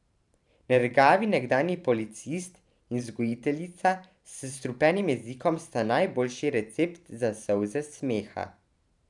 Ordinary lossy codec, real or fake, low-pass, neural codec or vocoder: none; fake; 10.8 kHz; vocoder, 44.1 kHz, 128 mel bands every 512 samples, BigVGAN v2